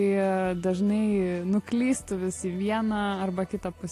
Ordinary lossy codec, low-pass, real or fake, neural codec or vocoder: AAC, 48 kbps; 14.4 kHz; real; none